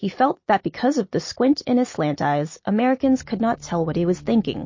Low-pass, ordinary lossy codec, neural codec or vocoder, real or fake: 7.2 kHz; MP3, 32 kbps; none; real